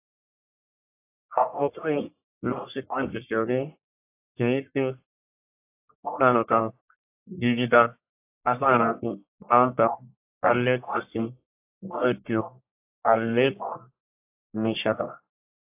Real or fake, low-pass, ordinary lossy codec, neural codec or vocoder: fake; 3.6 kHz; AAC, 24 kbps; codec, 44.1 kHz, 1.7 kbps, Pupu-Codec